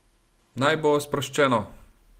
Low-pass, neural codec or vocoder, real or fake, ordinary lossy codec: 14.4 kHz; none; real; Opus, 24 kbps